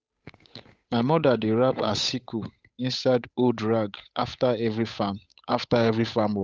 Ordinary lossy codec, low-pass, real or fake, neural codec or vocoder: none; none; fake; codec, 16 kHz, 8 kbps, FunCodec, trained on Chinese and English, 25 frames a second